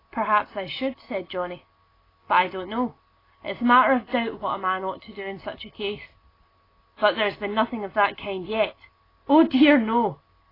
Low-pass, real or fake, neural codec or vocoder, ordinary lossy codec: 5.4 kHz; real; none; AAC, 24 kbps